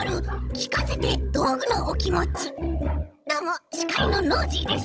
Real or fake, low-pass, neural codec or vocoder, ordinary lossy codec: fake; none; codec, 16 kHz, 16 kbps, FunCodec, trained on Chinese and English, 50 frames a second; none